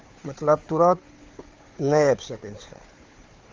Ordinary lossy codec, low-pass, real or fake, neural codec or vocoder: Opus, 32 kbps; 7.2 kHz; fake; codec, 16 kHz, 4 kbps, FunCodec, trained on Chinese and English, 50 frames a second